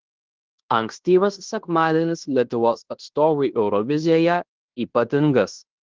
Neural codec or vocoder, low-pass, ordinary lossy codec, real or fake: codec, 16 kHz in and 24 kHz out, 0.9 kbps, LongCat-Audio-Codec, fine tuned four codebook decoder; 7.2 kHz; Opus, 16 kbps; fake